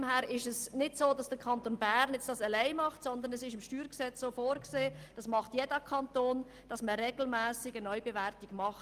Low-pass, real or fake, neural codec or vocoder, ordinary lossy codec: 14.4 kHz; real; none; Opus, 24 kbps